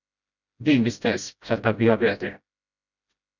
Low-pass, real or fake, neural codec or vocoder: 7.2 kHz; fake; codec, 16 kHz, 0.5 kbps, FreqCodec, smaller model